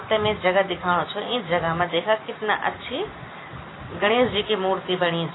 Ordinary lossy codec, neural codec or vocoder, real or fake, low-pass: AAC, 16 kbps; none; real; 7.2 kHz